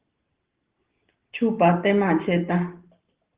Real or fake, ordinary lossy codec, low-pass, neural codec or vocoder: real; Opus, 16 kbps; 3.6 kHz; none